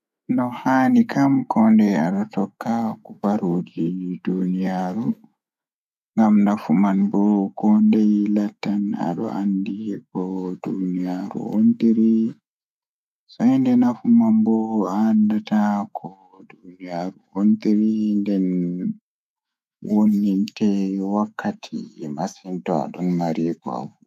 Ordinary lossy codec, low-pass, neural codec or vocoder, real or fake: MP3, 96 kbps; 14.4 kHz; autoencoder, 48 kHz, 128 numbers a frame, DAC-VAE, trained on Japanese speech; fake